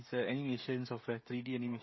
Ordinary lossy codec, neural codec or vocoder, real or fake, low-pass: MP3, 24 kbps; codec, 16 kHz, 16 kbps, FreqCodec, smaller model; fake; 7.2 kHz